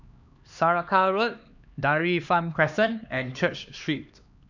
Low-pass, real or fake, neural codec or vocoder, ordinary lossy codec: 7.2 kHz; fake; codec, 16 kHz, 2 kbps, X-Codec, HuBERT features, trained on LibriSpeech; none